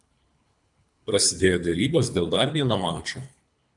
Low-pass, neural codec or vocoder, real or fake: 10.8 kHz; codec, 24 kHz, 3 kbps, HILCodec; fake